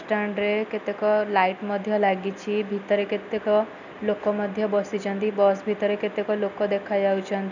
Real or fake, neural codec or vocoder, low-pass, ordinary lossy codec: real; none; 7.2 kHz; none